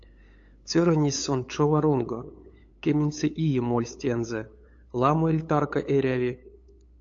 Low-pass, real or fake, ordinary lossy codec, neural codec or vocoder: 7.2 kHz; fake; AAC, 48 kbps; codec, 16 kHz, 8 kbps, FunCodec, trained on LibriTTS, 25 frames a second